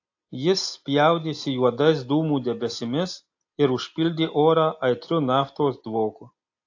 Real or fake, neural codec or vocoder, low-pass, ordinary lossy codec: real; none; 7.2 kHz; AAC, 48 kbps